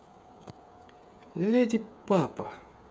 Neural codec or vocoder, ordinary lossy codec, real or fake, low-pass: codec, 16 kHz, 8 kbps, FreqCodec, smaller model; none; fake; none